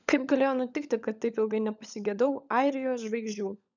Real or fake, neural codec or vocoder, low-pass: fake; codec, 16 kHz, 16 kbps, FunCodec, trained on LibriTTS, 50 frames a second; 7.2 kHz